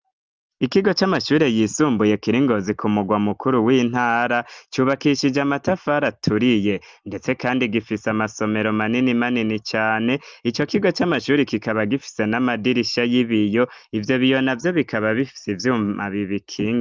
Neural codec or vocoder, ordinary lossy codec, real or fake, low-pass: none; Opus, 24 kbps; real; 7.2 kHz